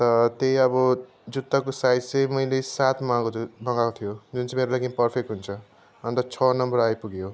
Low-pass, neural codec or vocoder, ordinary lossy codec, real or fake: none; none; none; real